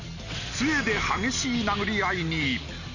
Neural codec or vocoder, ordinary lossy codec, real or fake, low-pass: none; none; real; 7.2 kHz